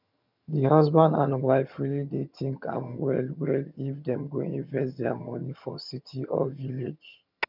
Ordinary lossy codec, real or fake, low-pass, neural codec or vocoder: none; fake; 5.4 kHz; vocoder, 22.05 kHz, 80 mel bands, HiFi-GAN